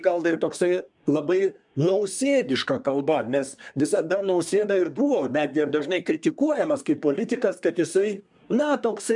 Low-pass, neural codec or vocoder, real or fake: 10.8 kHz; codec, 24 kHz, 1 kbps, SNAC; fake